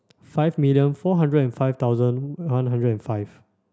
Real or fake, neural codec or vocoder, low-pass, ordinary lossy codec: real; none; none; none